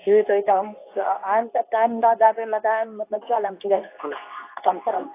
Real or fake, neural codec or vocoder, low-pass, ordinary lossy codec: fake; codec, 24 kHz, 0.9 kbps, WavTokenizer, medium speech release version 2; 3.6 kHz; AAC, 24 kbps